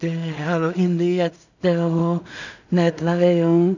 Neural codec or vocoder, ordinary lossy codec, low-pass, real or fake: codec, 16 kHz in and 24 kHz out, 0.4 kbps, LongCat-Audio-Codec, two codebook decoder; none; 7.2 kHz; fake